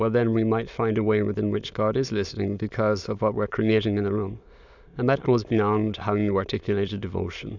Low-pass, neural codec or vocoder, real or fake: 7.2 kHz; autoencoder, 22.05 kHz, a latent of 192 numbers a frame, VITS, trained on many speakers; fake